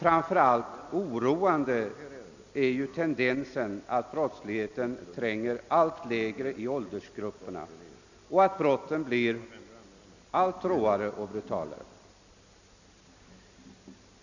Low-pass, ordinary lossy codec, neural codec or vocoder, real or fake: 7.2 kHz; none; none; real